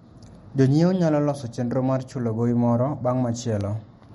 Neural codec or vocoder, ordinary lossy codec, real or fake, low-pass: none; MP3, 48 kbps; real; 19.8 kHz